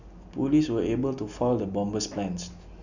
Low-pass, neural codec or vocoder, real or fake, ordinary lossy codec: 7.2 kHz; none; real; none